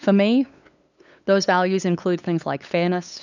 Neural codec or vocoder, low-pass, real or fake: codec, 16 kHz, 8 kbps, FunCodec, trained on LibriTTS, 25 frames a second; 7.2 kHz; fake